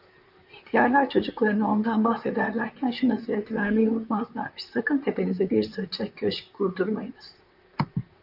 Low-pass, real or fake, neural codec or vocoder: 5.4 kHz; fake; vocoder, 44.1 kHz, 128 mel bands, Pupu-Vocoder